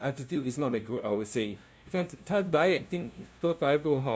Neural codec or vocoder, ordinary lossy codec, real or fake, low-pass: codec, 16 kHz, 0.5 kbps, FunCodec, trained on LibriTTS, 25 frames a second; none; fake; none